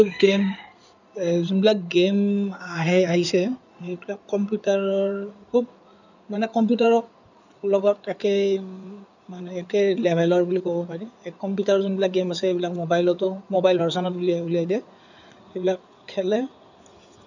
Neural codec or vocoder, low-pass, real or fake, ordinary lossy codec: codec, 16 kHz in and 24 kHz out, 2.2 kbps, FireRedTTS-2 codec; 7.2 kHz; fake; none